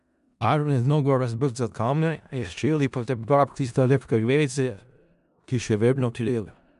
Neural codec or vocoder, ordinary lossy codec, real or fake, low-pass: codec, 16 kHz in and 24 kHz out, 0.4 kbps, LongCat-Audio-Codec, four codebook decoder; none; fake; 10.8 kHz